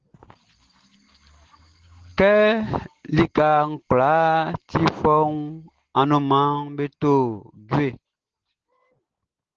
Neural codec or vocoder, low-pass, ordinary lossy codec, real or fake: none; 7.2 kHz; Opus, 16 kbps; real